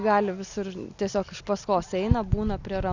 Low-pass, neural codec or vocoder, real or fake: 7.2 kHz; none; real